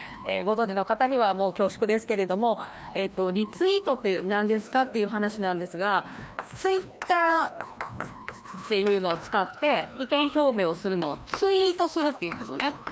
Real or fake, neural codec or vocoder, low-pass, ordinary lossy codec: fake; codec, 16 kHz, 1 kbps, FreqCodec, larger model; none; none